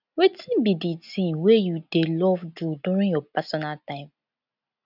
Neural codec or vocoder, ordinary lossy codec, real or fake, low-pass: none; none; real; 5.4 kHz